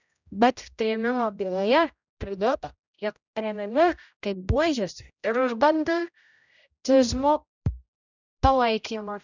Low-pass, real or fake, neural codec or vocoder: 7.2 kHz; fake; codec, 16 kHz, 0.5 kbps, X-Codec, HuBERT features, trained on general audio